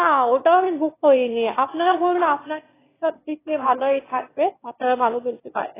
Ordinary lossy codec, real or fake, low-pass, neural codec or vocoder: AAC, 16 kbps; fake; 3.6 kHz; autoencoder, 22.05 kHz, a latent of 192 numbers a frame, VITS, trained on one speaker